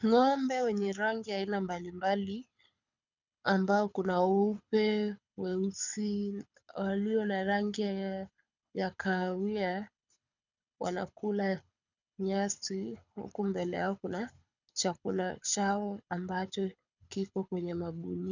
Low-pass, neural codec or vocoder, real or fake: 7.2 kHz; codec, 24 kHz, 6 kbps, HILCodec; fake